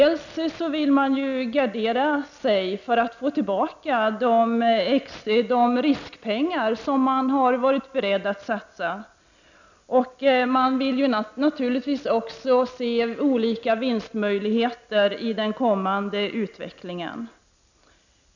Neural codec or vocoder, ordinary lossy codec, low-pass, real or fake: none; none; 7.2 kHz; real